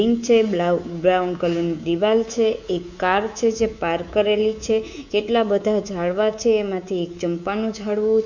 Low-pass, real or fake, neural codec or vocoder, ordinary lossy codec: 7.2 kHz; fake; codec, 24 kHz, 3.1 kbps, DualCodec; none